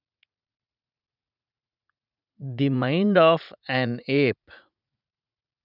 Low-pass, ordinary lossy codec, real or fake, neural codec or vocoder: 5.4 kHz; none; fake; vocoder, 44.1 kHz, 80 mel bands, Vocos